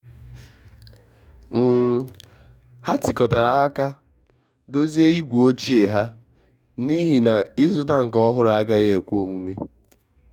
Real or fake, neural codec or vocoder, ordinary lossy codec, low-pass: fake; codec, 44.1 kHz, 2.6 kbps, DAC; none; 19.8 kHz